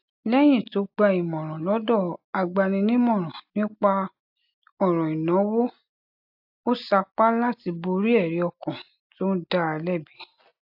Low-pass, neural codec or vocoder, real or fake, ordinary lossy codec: 5.4 kHz; none; real; AAC, 48 kbps